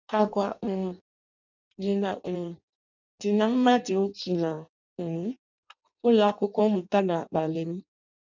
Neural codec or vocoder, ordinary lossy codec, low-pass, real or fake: codec, 16 kHz in and 24 kHz out, 0.6 kbps, FireRedTTS-2 codec; none; 7.2 kHz; fake